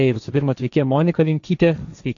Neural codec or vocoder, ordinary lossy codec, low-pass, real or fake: codec, 16 kHz, 1.1 kbps, Voila-Tokenizer; AAC, 64 kbps; 7.2 kHz; fake